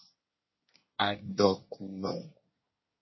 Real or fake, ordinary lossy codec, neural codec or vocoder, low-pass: fake; MP3, 24 kbps; codec, 24 kHz, 1 kbps, SNAC; 7.2 kHz